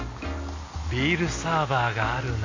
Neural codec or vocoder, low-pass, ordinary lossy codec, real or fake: none; 7.2 kHz; AAC, 48 kbps; real